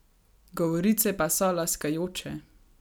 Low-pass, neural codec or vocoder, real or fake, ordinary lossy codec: none; none; real; none